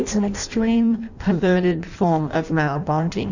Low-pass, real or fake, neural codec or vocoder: 7.2 kHz; fake; codec, 16 kHz in and 24 kHz out, 0.6 kbps, FireRedTTS-2 codec